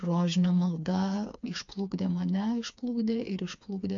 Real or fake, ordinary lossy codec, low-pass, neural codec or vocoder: fake; AAC, 64 kbps; 7.2 kHz; codec, 16 kHz, 4 kbps, FreqCodec, smaller model